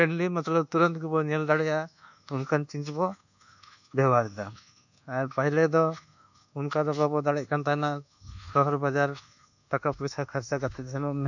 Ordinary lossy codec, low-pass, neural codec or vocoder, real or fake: none; 7.2 kHz; codec, 24 kHz, 1.2 kbps, DualCodec; fake